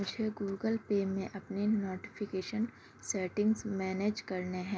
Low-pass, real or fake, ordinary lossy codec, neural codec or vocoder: none; real; none; none